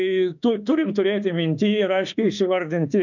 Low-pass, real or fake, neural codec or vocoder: 7.2 kHz; fake; autoencoder, 48 kHz, 32 numbers a frame, DAC-VAE, trained on Japanese speech